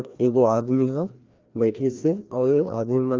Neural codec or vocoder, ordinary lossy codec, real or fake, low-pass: codec, 16 kHz, 1 kbps, FreqCodec, larger model; Opus, 24 kbps; fake; 7.2 kHz